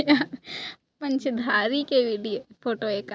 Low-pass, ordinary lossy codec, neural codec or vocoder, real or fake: none; none; none; real